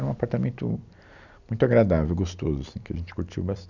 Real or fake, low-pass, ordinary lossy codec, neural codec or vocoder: real; 7.2 kHz; none; none